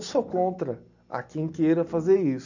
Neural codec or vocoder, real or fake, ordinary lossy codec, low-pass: none; real; none; 7.2 kHz